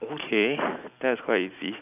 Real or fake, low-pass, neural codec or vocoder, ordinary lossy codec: real; 3.6 kHz; none; none